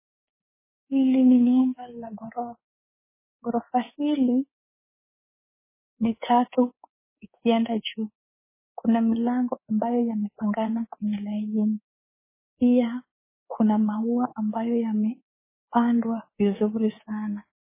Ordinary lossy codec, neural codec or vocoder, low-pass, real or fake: MP3, 16 kbps; codec, 24 kHz, 3.1 kbps, DualCodec; 3.6 kHz; fake